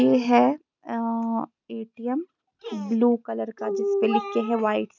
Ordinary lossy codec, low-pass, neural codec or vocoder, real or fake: none; 7.2 kHz; none; real